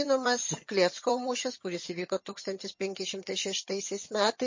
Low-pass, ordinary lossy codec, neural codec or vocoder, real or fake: 7.2 kHz; MP3, 32 kbps; vocoder, 22.05 kHz, 80 mel bands, HiFi-GAN; fake